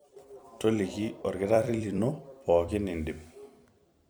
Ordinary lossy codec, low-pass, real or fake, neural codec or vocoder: none; none; fake; vocoder, 44.1 kHz, 128 mel bands every 256 samples, BigVGAN v2